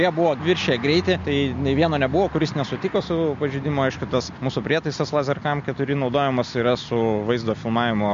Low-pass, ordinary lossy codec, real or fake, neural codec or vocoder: 7.2 kHz; MP3, 48 kbps; real; none